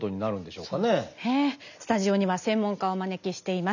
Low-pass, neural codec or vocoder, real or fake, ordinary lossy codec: 7.2 kHz; none; real; none